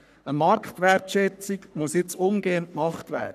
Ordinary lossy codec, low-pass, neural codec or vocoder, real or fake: none; 14.4 kHz; codec, 44.1 kHz, 3.4 kbps, Pupu-Codec; fake